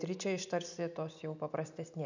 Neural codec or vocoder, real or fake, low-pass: none; real; 7.2 kHz